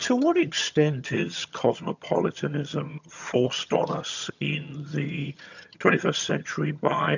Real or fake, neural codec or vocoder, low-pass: fake; vocoder, 22.05 kHz, 80 mel bands, HiFi-GAN; 7.2 kHz